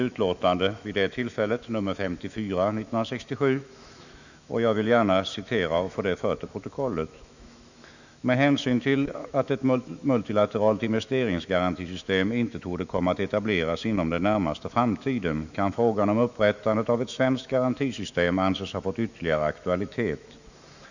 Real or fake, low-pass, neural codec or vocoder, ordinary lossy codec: fake; 7.2 kHz; autoencoder, 48 kHz, 128 numbers a frame, DAC-VAE, trained on Japanese speech; none